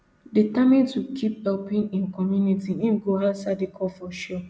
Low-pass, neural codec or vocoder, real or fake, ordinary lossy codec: none; none; real; none